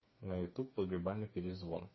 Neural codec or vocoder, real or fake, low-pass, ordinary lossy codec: codec, 16 kHz, 4 kbps, FreqCodec, smaller model; fake; 7.2 kHz; MP3, 24 kbps